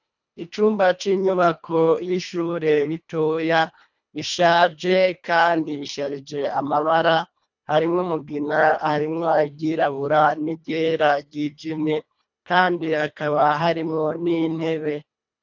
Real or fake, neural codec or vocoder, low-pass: fake; codec, 24 kHz, 1.5 kbps, HILCodec; 7.2 kHz